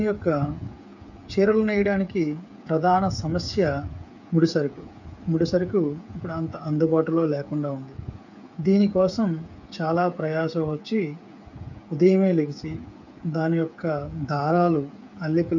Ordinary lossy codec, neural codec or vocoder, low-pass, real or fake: none; codec, 16 kHz, 8 kbps, FreqCodec, smaller model; 7.2 kHz; fake